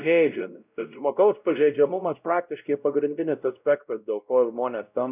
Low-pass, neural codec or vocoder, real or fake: 3.6 kHz; codec, 16 kHz, 0.5 kbps, X-Codec, WavLM features, trained on Multilingual LibriSpeech; fake